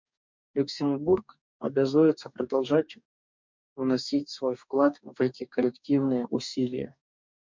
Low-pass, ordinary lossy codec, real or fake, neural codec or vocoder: 7.2 kHz; MP3, 64 kbps; fake; codec, 44.1 kHz, 2.6 kbps, DAC